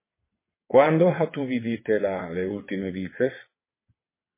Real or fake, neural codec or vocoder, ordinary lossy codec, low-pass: fake; vocoder, 22.05 kHz, 80 mel bands, WaveNeXt; MP3, 16 kbps; 3.6 kHz